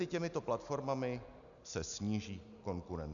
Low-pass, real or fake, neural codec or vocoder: 7.2 kHz; real; none